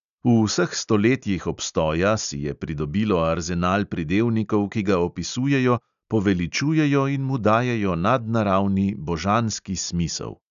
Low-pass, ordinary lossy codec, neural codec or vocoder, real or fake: 7.2 kHz; none; none; real